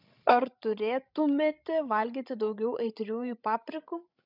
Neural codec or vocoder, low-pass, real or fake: codec, 16 kHz, 16 kbps, FreqCodec, larger model; 5.4 kHz; fake